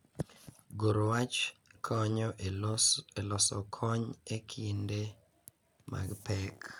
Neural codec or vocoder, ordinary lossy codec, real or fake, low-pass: none; none; real; none